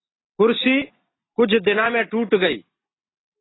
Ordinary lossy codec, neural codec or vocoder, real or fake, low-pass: AAC, 16 kbps; none; real; 7.2 kHz